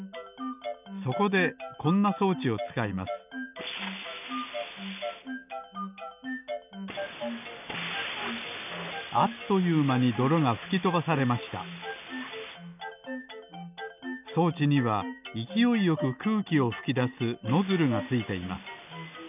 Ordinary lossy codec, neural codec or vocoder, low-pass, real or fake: none; none; 3.6 kHz; real